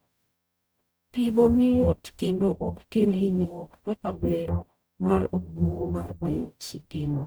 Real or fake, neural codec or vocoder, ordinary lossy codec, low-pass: fake; codec, 44.1 kHz, 0.9 kbps, DAC; none; none